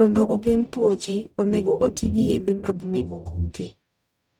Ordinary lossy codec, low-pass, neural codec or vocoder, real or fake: none; 19.8 kHz; codec, 44.1 kHz, 0.9 kbps, DAC; fake